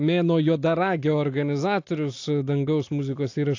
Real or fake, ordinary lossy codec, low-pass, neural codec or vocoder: real; AAC, 48 kbps; 7.2 kHz; none